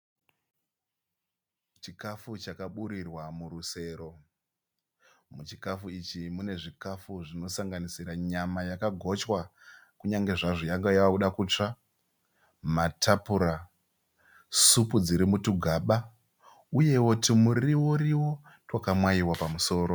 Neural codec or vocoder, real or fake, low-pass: none; real; 19.8 kHz